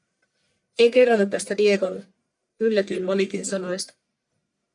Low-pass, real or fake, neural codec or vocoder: 10.8 kHz; fake; codec, 44.1 kHz, 1.7 kbps, Pupu-Codec